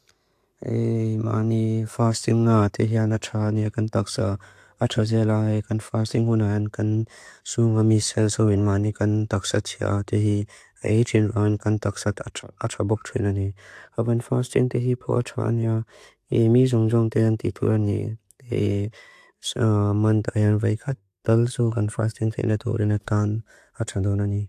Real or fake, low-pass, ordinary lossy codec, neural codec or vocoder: real; 14.4 kHz; AAC, 64 kbps; none